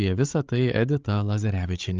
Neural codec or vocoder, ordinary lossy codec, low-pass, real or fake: codec, 16 kHz, 16 kbps, FreqCodec, larger model; Opus, 32 kbps; 7.2 kHz; fake